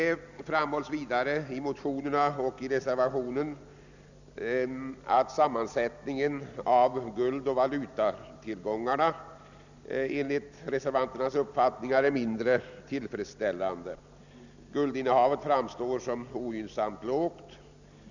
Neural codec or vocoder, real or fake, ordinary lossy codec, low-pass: none; real; none; 7.2 kHz